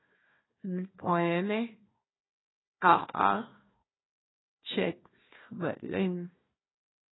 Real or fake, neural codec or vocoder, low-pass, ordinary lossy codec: fake; codec, 16 kHz, 1 kbps, FunCodec, trained on Chinese and English, 50 frames a second; 7.2 kHz; AAC, 16 kbps